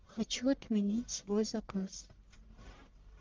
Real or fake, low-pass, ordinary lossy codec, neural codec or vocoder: fake; 7.2 kHz; Opus, 32 kbps; codec, 44.1 kHz, 1.7 kbps, Pupu-Codec